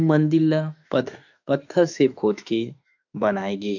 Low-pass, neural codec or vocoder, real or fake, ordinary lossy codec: 7.2 kHz; autoencoder, 48 kHz, 32 numbers a frame, DAC-VAE, trained on Japanese speech; fake; none